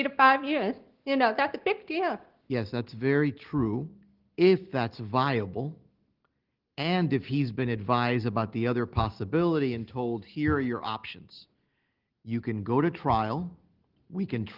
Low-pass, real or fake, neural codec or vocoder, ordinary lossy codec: 5.4 kHz; real; none; Opus, 16 kbps